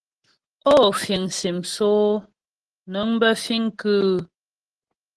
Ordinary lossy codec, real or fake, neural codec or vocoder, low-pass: Opus, 16 kbps; real; none; 10.8 kHz